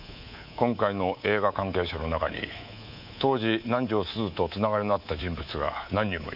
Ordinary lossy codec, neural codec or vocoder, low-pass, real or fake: none; codec, 24 kHz, 3.1 kbps, DualCodec; 5.4 kHz; fake